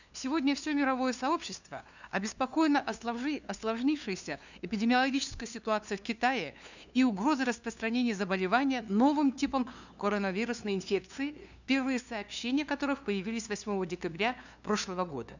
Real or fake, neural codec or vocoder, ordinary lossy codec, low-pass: fake; codec, 16 kHz, 2 kbps, FunCodec, trained on LibriTTS, 25 frames a second; none; 7.2 kHz